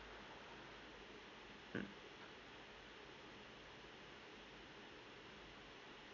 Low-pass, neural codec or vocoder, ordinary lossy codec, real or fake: 7.2 kHz; none; MP3, 64 kbps; real